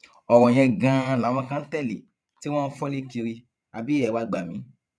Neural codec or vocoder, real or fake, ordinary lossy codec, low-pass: vocoder, 22.05 kHz, 80 mel bands, Vocos; fake; none; none